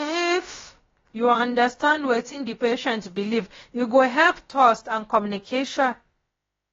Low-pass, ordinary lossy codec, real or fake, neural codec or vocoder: 7.2 kHz; AAC, 24 kbps; fake; codec, 16 kHz, about 1 kbps, DyCAST, with the encoder's durations